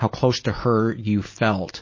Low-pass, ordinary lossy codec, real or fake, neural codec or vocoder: 7.2 kHz; MP3, 32 kbps; real; none